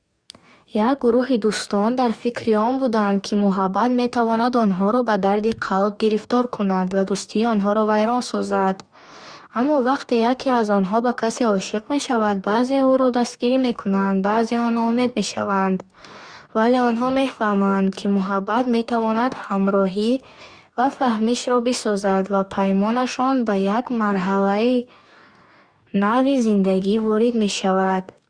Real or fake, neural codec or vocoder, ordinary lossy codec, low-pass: fake; codec, 44.1 kHz, 2.6 kbps, DAC; Opus, 64 kbps; 9.9 kHz